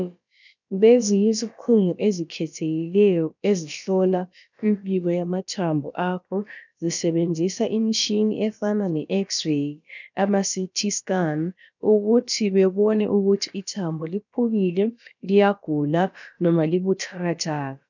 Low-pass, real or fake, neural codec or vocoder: 7.2 kHz; fake; codec, 16 kHz, about 1 kbps, DyCAST, with the encoder's durations